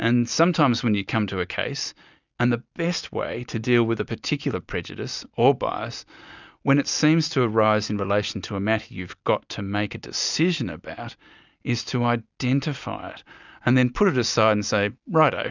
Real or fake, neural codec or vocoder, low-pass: real; none; 7.2 kHz